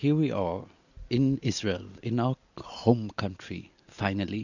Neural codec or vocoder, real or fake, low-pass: none; real; 7.2 kHz